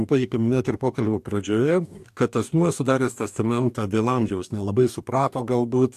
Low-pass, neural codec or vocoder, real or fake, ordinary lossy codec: 14.4 kHz; codec, 44.1 kHz, 2.6 kbps, DAC; fake; AAC, 96 kbps